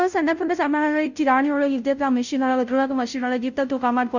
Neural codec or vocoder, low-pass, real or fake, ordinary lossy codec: codec, 16 kHz, 0.5 kbps, FunCodec, trained on Chinese and English, 25 frames a second; 7.2 kHz; fake; none